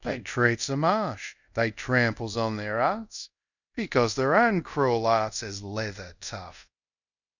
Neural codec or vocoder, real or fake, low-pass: codec, 24 kHz, 0.5 kbps, DualCodec; fake; 7.2 kHz